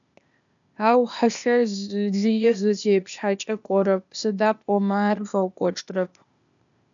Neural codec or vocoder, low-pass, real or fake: codec, 16 kHz, 0.8 kbps, ZipCodec; 7.2 kHz; fake